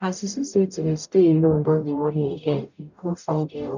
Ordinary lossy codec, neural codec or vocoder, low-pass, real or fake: none; codec, 44.1 kHz, 0.9 kbps, DAC; 7.2 kHz; fake